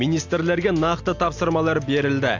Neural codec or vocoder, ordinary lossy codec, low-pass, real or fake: none; AAC, 48 kbps; 7.2 kHz; real